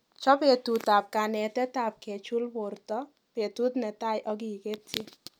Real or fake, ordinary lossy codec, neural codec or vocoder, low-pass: real; none; none; none